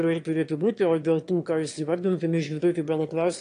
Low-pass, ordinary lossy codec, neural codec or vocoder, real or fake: 9.9 kHz; AAC, 48 kbps; autoencoder, 22.05 kHz, a latent of 192 numbers a frame, VITS, trained on one speaker; fake